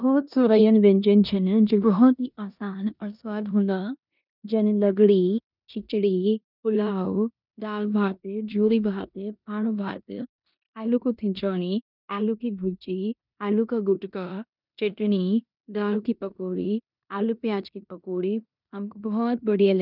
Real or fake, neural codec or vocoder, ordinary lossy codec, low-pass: fake; codec, 16 kHz in and 24 kHz out, 0.9 kbps, LongCat-Audio-Codec, four codebook decoder; none; 5.4 kHz